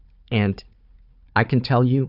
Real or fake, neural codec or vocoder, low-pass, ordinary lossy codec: fake; codec, 16 kHz, 4 kbps, FunCodec, trained on Chinese and English, 50 frames a second; 5.4 kHz; Opus, 64 kbps